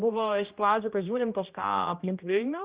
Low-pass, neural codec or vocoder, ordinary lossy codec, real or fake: 3.6 kHz; codec, 16 kHz, 1 kbps, X-Codec, HuBERT features, trained on general audio; Opus, 24 kbps; fake